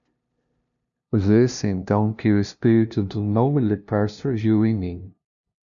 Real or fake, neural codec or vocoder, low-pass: fake; codec, 16 kHz, 0.5 kbps, FunCodec, trained on LibriTTS, 25 frames a second; 7.2 kHz